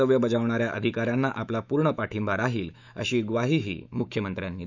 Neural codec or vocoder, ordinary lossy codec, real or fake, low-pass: autoencoder, 48 kHz, 128 numbers a frame, DAC-VAE, trained on Japanese speech; none; fake; 7.2 kHz